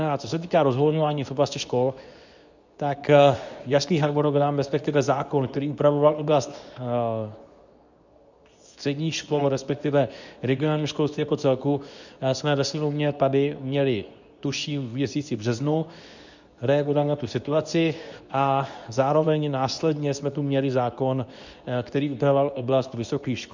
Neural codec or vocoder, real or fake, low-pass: codec, 24 kHz, 0.9 kbps, WavTokenizer, medium speech release version 2; fake; 7.2 kHz